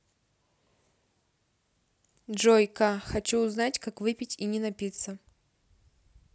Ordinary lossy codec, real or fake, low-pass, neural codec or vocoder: none; real; none; none